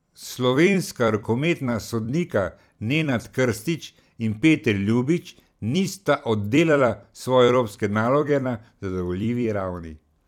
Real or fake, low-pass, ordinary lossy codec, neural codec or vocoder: fake; 19.8 kHz; none; vocoder, 44.1 kHz, 128 mel bands every 256 samples, BigVGAN v2